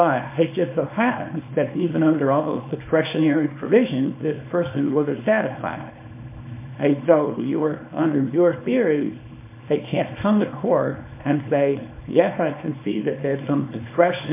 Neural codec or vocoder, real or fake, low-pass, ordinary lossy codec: codec, 24 kHz, 0.9 kbps, WavTokenizer, small release; fake; 3.6 kHz; MP3, 24 kbps